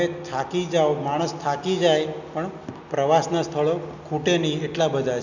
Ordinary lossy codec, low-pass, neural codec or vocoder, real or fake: none; 7.2 kHz; none; real